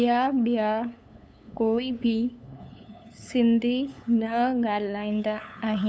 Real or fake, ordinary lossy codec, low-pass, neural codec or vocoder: fake; none; none; codec, 16 kHz, 8 kbps, FunCodec, trained on LibriTTS, 25 frames a second